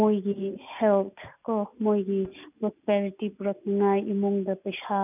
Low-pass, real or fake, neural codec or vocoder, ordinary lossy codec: 3.6 kHz; real; none; none